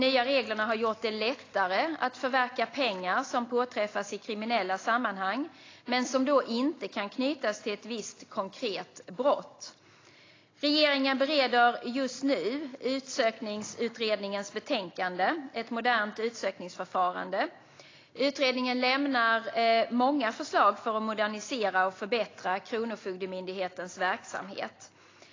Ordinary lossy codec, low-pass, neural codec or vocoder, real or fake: AAC, 32 kbps; 7.2 kHz; none; real